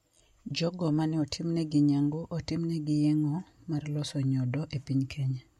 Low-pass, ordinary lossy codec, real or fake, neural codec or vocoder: 19.8 kHz; MP3, 64 kbps; real; none